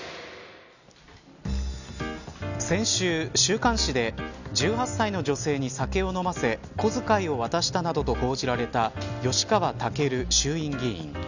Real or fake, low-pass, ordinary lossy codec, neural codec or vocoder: real; 7.2 kHz; none; none